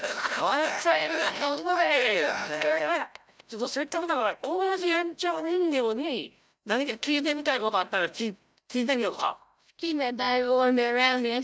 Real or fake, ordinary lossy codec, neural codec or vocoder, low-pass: fake; none; codec, 16 kHz, 0.5 kbps, FreqCodec, larger model; none